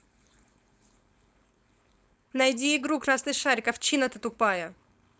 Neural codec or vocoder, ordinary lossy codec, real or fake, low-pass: codec, 16 kHz, 4.8 kbps, FACodec; none; fake; none